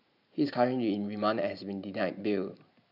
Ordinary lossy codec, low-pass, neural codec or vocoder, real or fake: none; 5.4 kHz; vocoder, 44.1 kHz, 128 mel bands every 512 samples, BigVGAN v2; fake